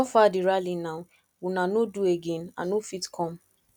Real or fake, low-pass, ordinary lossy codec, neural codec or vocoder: real; 19.8 kHz; none; none